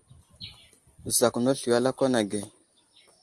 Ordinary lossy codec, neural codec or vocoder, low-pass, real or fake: Opus, 24 kbps; none; 10.8 kHz; real